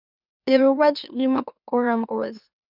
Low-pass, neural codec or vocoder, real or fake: 5.4 kHz; autoencoder, 44.1 kHz, a latent of 192 numbers a frame, MeloTTS; fake